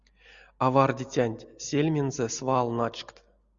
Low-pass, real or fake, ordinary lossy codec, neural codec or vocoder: 7.2 kHz; real; Opus, 64 kbps; none